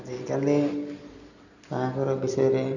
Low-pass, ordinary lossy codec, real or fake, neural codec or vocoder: 7.2 kHz; none; real; none